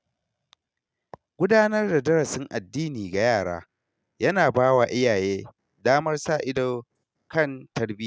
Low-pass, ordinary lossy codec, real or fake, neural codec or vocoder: none; none; real; none